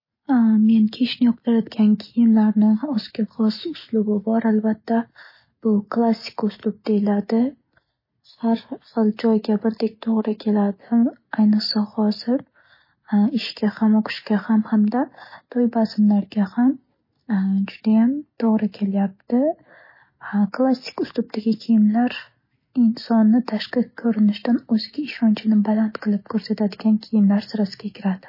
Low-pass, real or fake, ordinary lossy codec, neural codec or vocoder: 5.4 kHz; real; MP3, 24 kbps; none